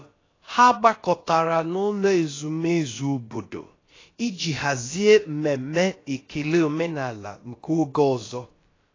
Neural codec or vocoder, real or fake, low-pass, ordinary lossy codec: codec, 16 kHz, about 1 kbps, DyCAST, with the encoder's durations; fake; 7.2 kHz; AAC, 32 kbps